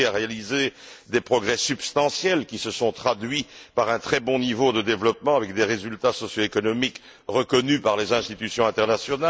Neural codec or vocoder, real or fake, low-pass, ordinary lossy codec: none; real; none; none